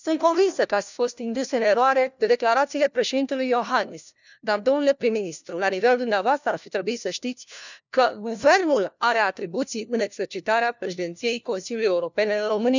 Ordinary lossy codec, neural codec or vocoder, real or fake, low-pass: none; codec, 16 kHz, 1 kbps, FunCodec, trained on LibriTTS, 50 frames a second; fake; 7.2 kHz